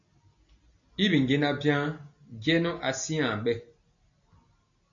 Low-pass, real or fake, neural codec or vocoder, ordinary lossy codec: 7.2 kHz; real; none; MP3, 64 kbps